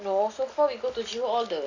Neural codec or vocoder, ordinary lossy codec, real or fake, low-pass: none; AAC, 48 kbps; real; 7.2 kHz